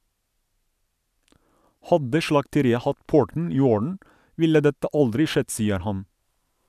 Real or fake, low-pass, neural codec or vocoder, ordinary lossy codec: real; 14.4 kHz; none; none